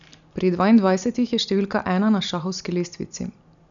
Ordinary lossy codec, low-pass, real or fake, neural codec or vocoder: none; 7.2 kHz; real; none